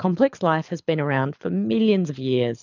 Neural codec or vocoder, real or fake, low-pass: codec, 24 kHz, 6 kbps, HILCodec; fake; 7.2 kHz